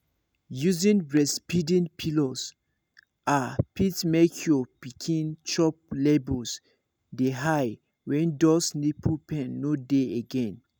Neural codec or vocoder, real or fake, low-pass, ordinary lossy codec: none; real; none; none